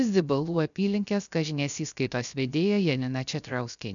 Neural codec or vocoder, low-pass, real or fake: codec, 16 kHz, 0.3 kbps, FocalCodec; 7.2 kHz; fake